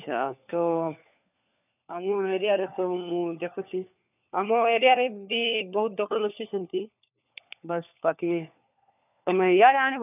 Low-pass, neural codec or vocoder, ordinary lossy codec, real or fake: 3.6 kHz; codec, 16 kHz, 4 kbps, FunCodec, trained on LibriTTS, 50 frames a second; none; fake